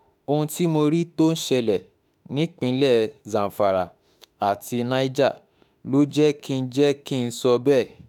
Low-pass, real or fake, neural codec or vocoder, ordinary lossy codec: 19.8 kHz; fake; autoencoder, 48 kHz, 32 numbers a frame, DAC-VAE, trained on Japanese speech; none